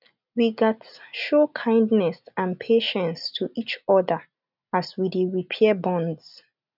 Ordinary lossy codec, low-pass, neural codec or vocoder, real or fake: none; 5.4 kHz; none; real